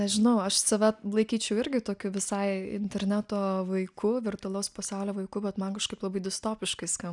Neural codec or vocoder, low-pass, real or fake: none; 10.8 kHz; real